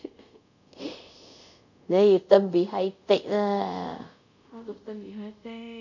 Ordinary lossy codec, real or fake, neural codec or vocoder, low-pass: none; fake; codec, 24 kHz, 0.5 kbps, DualCodec; 7.2 kHz